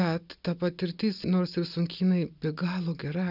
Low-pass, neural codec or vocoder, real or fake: 5.4 kHz; none; real